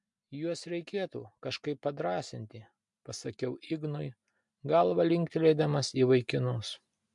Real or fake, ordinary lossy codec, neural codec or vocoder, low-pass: real; MP3, 64 kbps; none; 10.8 kHz